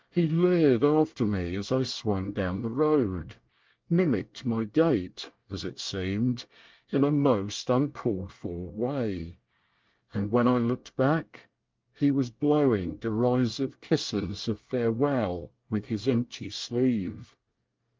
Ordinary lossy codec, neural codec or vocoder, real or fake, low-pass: Opus, 24 kbps; codec, 24 kHz, 1 kbps, SNAC; fake; 7.2 kHz